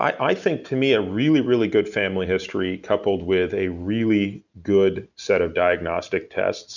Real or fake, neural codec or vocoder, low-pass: real; none; 7.2 kHz